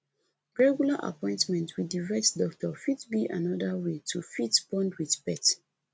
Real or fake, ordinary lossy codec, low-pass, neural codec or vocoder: real; none; none; none